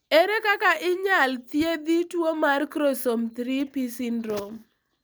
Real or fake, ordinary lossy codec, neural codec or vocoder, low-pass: real; none; none; none